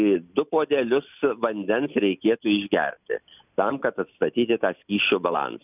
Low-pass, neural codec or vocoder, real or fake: 3.6 kHz; none; real